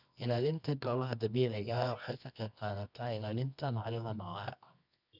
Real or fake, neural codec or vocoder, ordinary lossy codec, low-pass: fake; codec, 24 kHz, 0.9 kbps, WavTokenizer, medium music audio release; none; 5.4 kHz